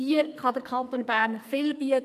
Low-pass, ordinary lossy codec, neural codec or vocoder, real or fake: 14.4 kHz; none; codec, 44.1 kHz, 2.6 kbps, SNAC; fake